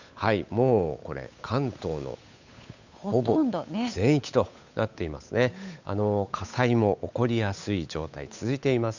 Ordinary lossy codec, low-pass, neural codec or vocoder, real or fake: none; 7.2 kHz; codec, 16 kHz, 8 kbps, FunCodec, trained on Chinese and English, 25 frames a second; fake